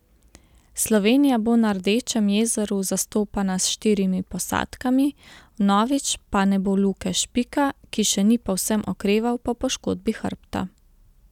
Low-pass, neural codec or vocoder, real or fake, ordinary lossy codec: 19.8 kHz; none; real; none